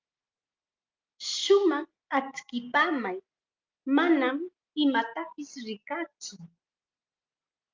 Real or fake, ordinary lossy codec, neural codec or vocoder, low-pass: real; Opus, 24 kbps; none; 7.2 kHz